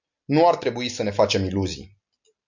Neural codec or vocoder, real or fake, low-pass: none; real; 7.2 kHz